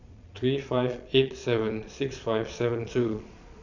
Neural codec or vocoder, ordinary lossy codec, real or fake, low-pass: vocoder, 22.05 kHz, 80 mel bands, Vocos; none; fake; 7.2 kHz